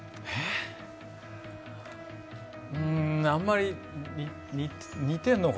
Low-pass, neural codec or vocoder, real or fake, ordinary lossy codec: none; none; real; none